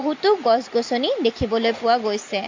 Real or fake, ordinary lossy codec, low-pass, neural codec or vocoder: real; MP3, 48 kbps; 7.2 kHz; none